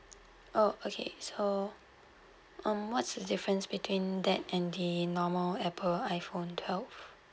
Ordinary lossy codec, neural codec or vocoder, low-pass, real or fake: none; none; none; real